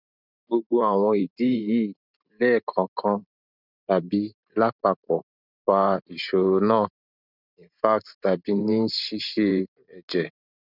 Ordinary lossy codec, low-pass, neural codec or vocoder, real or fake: none; 5.4 kHz; vocoder, 44.1 kHz, 128 mel bands every 512 samples, BigVGAN v2; fake